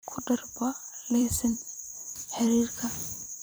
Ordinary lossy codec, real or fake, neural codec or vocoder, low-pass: none; fake; vocoder, 44.1 kHz, 128 mel bands every 256 samples, BigVGAN v2; none